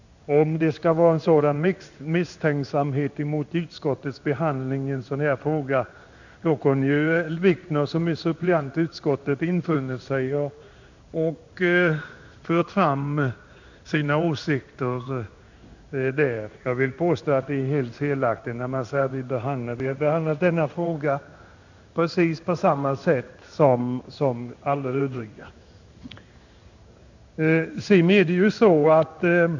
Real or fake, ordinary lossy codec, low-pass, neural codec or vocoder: fake; none; 7.2 kHz; codec, 16 kHz in and 24 kHz out, 1 kbps, XY-Tokenizer